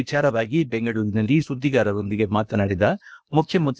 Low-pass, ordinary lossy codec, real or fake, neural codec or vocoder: none; none; fake; codec, 16 kHz, 0.8 kbps, ZipCodec